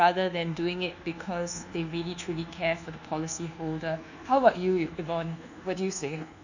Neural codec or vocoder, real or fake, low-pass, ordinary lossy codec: codec, 24 kHz, 1.2 kbps, DualCodec; fake; 7.2 kHz; none